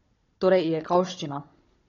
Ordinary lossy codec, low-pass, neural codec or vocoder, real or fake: AAC, 32 kbps; 7.2 kHz; codec, 16 kHz, 16 kbps, FunCodec, trained on Chinese and English, 50 frames a second; fake